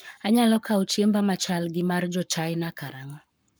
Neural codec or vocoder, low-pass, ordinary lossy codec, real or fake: codec, 44.1 kHz, 7.8 kbps, Pupu-Codec; none; none; fake